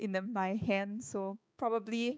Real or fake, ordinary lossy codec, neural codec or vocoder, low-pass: fake; none; codec, 16 kHz, 4 kbps, X-Codec, HuBERT features, trained on balanced general audio; none